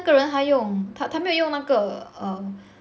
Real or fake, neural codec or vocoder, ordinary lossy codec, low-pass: real; none; none; none